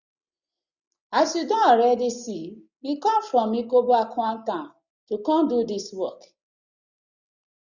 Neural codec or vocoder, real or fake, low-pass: none; real; 7.2 kHz